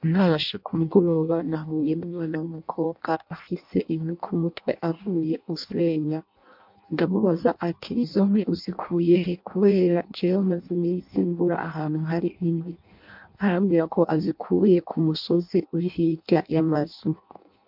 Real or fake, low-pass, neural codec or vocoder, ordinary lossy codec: fake; 5.4 kHz; codec, 16 kHz in and 24 kHz out, 0.6 kbps, FireRedTTS-2 codec; MP3, 48 kbps